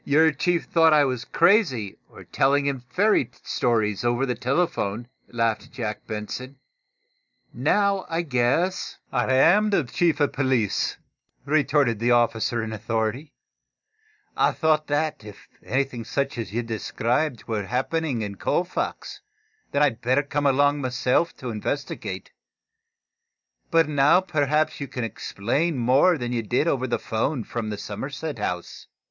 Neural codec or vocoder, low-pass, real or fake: none; 7.2 kHz; real